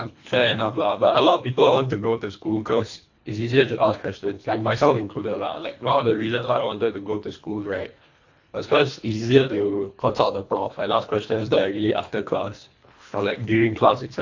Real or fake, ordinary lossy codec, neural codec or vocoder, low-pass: fake; AAC, 48 kbps; codec, 24 kHz, 1.5 kbps, HILCodec; 7.2 kHz